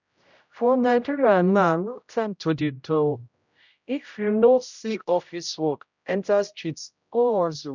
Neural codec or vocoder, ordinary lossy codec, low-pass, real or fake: codec, 16 kHz, 0.5 kbps, X-Codec, HuBERT features, trained on general audio; none; 7.2 kHz; fake